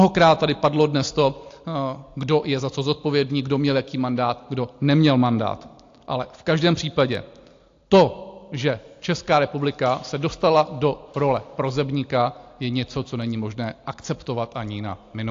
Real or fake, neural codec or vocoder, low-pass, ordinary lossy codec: real; none; 7.2 kHz; AAC, 48 kbps